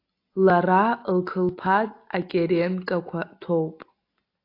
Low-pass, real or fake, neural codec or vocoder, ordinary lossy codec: 5.4 kHz; real; none; AAC, 32 kbps